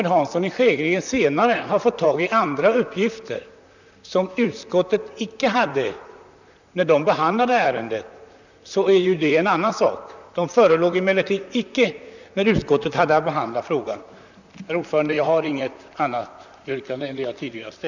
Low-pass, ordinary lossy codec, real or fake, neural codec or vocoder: 7.2 kHz; none; fake; vocoder, 44.1 kHz, 128 mel bands, Pupu-Vocoder